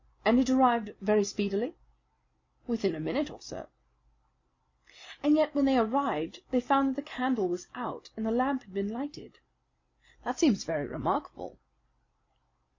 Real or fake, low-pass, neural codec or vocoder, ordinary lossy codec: real; 7.2 kHz; none; MP3, 64 kbps